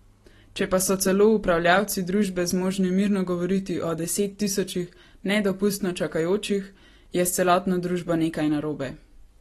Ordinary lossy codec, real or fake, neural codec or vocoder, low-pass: AAC, 32 kbps; real; none; 19.8 kHz